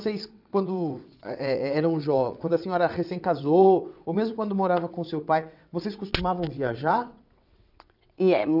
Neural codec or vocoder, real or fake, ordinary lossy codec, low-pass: vocoder, 22.05 kHz, 80 mel bands, WaveNeXt; fake; none; 5.4 kHz